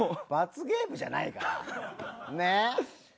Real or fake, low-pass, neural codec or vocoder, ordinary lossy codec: real; none; none; none